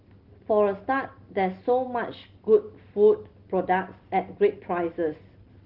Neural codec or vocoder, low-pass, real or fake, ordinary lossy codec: none; 5.4 kHz; real; Opus, 16 kbps